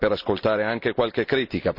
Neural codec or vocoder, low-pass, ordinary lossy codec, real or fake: none; 5.4 kHz; none; real